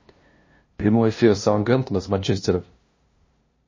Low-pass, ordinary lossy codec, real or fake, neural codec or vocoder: 7.2 kHz; MP3, 32 kbps; fake; codec, 16 kHz, 0.5 kbps, FunCodec, trained on LibriTTS, 25 frames a second